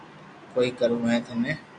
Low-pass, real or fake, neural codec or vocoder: 9.9 kHz; real; none